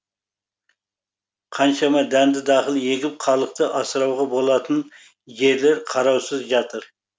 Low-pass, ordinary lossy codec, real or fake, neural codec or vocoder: none; none; real; none